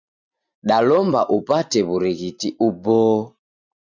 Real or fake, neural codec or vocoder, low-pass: real; none; 7.2 kHz